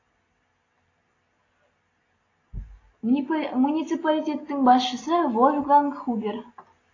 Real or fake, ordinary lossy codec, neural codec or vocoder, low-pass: real; AAC, 32 kbps; none; 7.2 kHz